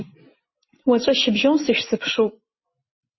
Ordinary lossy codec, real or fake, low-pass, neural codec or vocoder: MP3, 24 kbps; real; 7.2 kHz; none